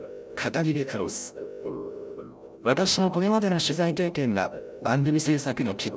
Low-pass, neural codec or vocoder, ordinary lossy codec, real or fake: none; codec, 16 kHz, 0.5 kbps, FreqCodec, larger model; none; fake